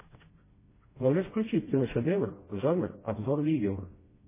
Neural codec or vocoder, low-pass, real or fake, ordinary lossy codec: codec, 16 kHz, 1 kbps, FreqCodec, smaller model; 3.6 kHz; fake; MP3, 16 kbps